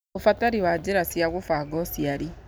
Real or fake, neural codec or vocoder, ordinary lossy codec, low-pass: real; none; none; none